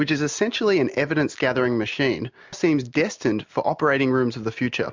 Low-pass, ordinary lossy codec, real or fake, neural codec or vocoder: 7.2 kHz; MP3, 64 kbps; real; none